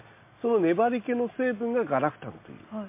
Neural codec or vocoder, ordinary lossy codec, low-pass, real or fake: none; MP3, 24 kbps; 3.6 kHz; real